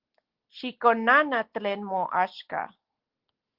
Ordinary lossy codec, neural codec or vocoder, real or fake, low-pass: Opus, 16 kbps; none; real; 5.4 kHz